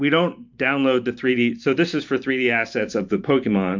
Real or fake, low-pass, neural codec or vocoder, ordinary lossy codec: fake; 7.2 kHz; vocoder, 44.1 kHz, 128 mel bands every 512 samples, BigVGAN v2; MP3, 64 kbps